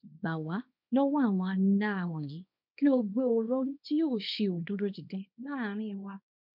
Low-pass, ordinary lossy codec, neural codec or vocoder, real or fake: 5.4 kHz; MP3, 48 kbps; codec, 24 kHz, 0.9 kbps, WavTokenizer, small release; fake